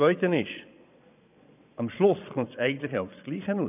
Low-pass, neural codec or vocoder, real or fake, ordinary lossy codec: 3.6 kHz; vocoder, 22.05 kHz, 80 mel bands, Vocos; fake; none